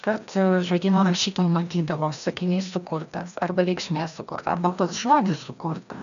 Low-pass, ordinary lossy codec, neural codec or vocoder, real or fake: 7.2 kHz; MP3, 96 kbps; codec, 16 kHz, 1 kbps, FreqCodec, larger model; fake